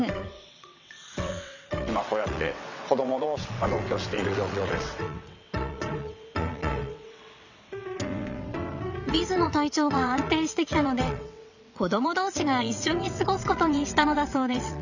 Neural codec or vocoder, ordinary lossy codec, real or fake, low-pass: vocoder, 22.05 kHz, 80 mel bands, WaveNeXt; none; fake; 7.2 kHz